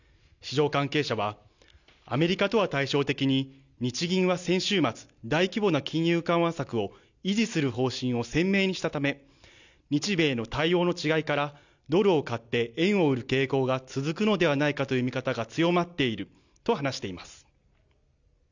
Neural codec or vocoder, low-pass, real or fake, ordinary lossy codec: none; 7.2 kHz; real; none